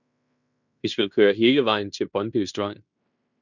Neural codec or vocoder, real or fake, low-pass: codec, 16 kHz in and 24 kHz out, 0.9 kbps, LongCat-Audio-Codec, fine tuned four codebook decoder; fake; 7.2 kHz